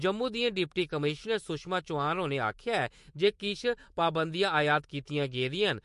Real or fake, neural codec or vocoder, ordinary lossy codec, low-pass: fake; autoencoder, 48 kHz, 128 numbers a frame, DAC-VAE, trained on Japanese speech; MP3, 48 kbps; 14.4 kHz